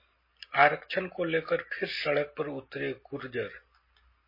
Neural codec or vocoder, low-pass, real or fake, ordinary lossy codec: none; 5.4 kHz; real; MP3, 24 kbps